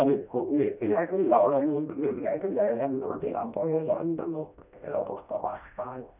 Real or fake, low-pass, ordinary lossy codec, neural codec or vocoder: fake; 3.6 kHz; none; codec, 16 kHz, 1 kbps, FreqCodec, smaller model